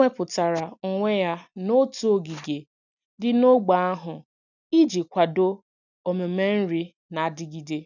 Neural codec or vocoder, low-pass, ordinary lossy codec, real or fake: none; 7.2 kHz; none; real